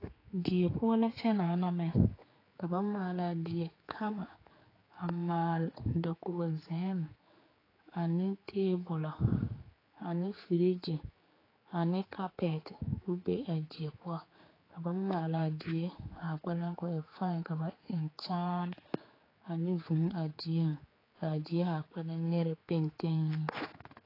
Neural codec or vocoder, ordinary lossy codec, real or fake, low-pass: codec, 16 kHz, 4 kbps, X-Codec, HuBERT features, trained on general audio; AAC, 24 kbps; fake; 5.4 kHz